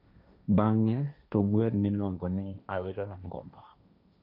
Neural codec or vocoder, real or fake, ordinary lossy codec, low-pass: codec, 16 kHz, 1.1 kbps, Voila-Tokenizer; fake; none; 5.4 kHz